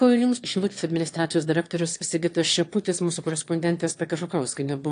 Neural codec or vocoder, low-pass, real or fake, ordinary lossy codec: autoencoder, 22.05 kHz, a latent of 192 numbers a frame, VITS, trained on one speaker; 9.9 kHz; fake; AAC, 48 kbps